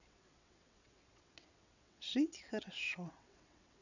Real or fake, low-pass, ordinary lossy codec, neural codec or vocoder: fake; 7.2 kHz; none; vocoder, 22.05 kHz, 80 mel bands, WaveNeXt